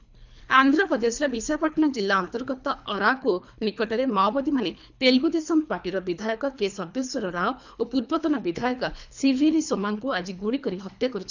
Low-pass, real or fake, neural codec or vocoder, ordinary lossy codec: 7.2 kHz; fake; codec, 24 kHz, 3 kbps, HILCodec; none